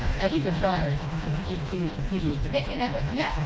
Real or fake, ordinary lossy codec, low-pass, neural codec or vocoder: fake; none; none; codec, 16 kHz, 1 kbps, FreqCodec, smaller model